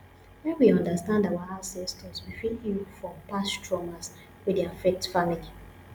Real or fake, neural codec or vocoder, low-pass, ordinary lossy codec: real; none; none; none